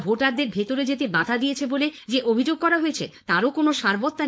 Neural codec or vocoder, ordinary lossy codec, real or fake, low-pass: codec, 16 kHz, 4.8 kbps, FACodec; none; fake; none